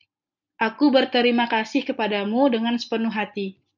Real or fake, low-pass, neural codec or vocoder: real; 7.2 kHz; none